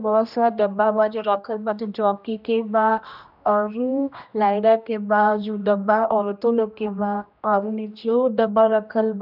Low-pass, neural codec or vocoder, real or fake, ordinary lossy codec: 5.4 kHz; codec, 16 kHz, 1 kbps, X-Codec, HuBERT features, trained on general audio; fake; none